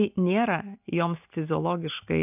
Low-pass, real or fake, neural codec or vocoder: 3.6 kHz; real; none